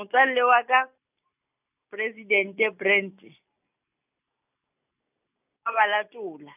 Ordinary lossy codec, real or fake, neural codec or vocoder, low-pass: none; real; none; 3.6 kHz